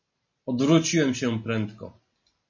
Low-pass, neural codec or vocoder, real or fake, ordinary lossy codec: 7.2 kHz; none; real; MP3, 32 kbps